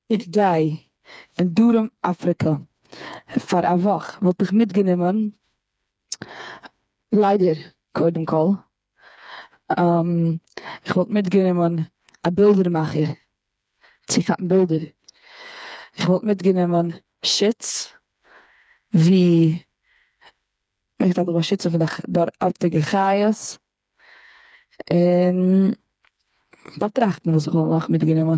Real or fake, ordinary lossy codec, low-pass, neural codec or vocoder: fake; none; none; codec, 16 kHz, 4 kbps, FreqCodec, smaller model